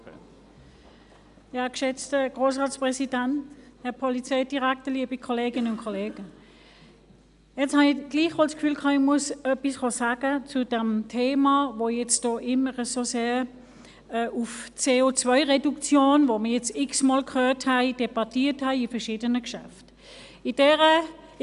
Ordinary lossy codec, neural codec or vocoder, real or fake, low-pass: AAC, 96 kbps; none; real; 10.8 kHz